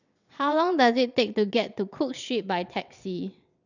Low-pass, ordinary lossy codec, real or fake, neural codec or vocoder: 7.2 kHz; none; fake; vocoder, 22.05 kHz, 80 mel bands, WaveNeXt